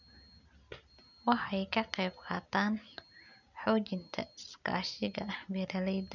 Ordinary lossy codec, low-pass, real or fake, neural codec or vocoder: none; 7.2 kHz; real; none